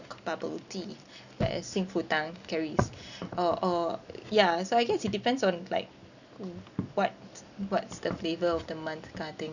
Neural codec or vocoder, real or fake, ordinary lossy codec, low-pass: none; real; none; 7.2 kHz